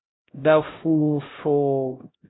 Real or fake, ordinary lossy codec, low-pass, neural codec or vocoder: fake; AAC, 16 kbps; 7.2 kHz; codec, 16 kHz, 0.5 kbps, X-Codec, HuBERT features, trained on LibriSpeech